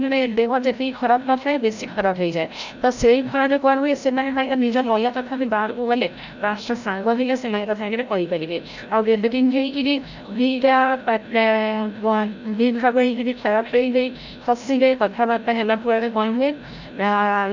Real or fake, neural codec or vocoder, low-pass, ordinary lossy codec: fake; codec, 16 kHz, 0.5 kbps, FreqCodec, larger model; 7.2 kHz; none